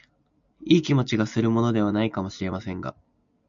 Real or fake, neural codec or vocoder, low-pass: real; none; 7.2 kHz